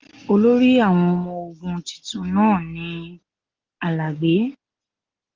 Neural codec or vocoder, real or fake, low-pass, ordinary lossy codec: none; real; 7.2 kHz; Opus, 32 kbps